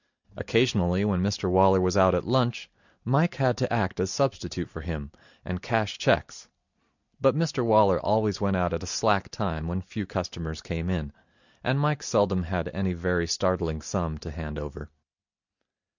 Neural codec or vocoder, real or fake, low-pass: none; real; 7.2 kHz